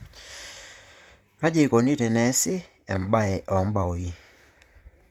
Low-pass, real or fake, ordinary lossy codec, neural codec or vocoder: 19.8 kHz; fake; none; vocoder, 44.1 kHz, 128 mel bands, Pupu-Vocoder